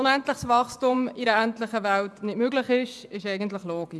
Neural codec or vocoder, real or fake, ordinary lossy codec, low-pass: vocoder, 24 kHz, 100 mel bands, Vocos; fake; none; none